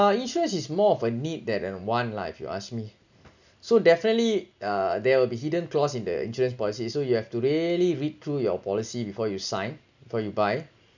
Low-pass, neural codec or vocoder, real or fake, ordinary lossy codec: 7.2 kHz; none; real; none